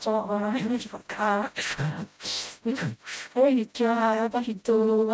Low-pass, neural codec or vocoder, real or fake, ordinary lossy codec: none; codec, 16 kHz, 0.5 kbps, FreqCodec, smaller model; fake; none